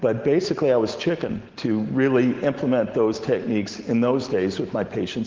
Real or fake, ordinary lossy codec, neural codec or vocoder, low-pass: real; Opus, 16 kbps; none; 7.2 kHz